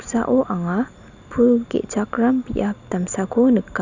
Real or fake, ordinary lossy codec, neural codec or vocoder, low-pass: real; none; none; 7.2 kHz